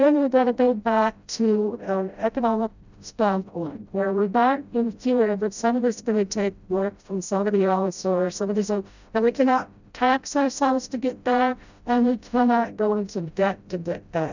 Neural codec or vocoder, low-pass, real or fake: codec, 16 kHz, 0.5 kbps, FreqCodec, smaller model; 7.2 kHz; fake